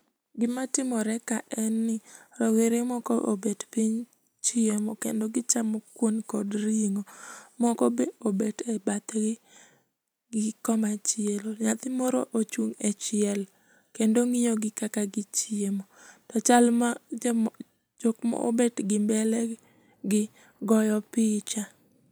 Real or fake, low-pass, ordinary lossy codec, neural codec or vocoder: real; none; none; none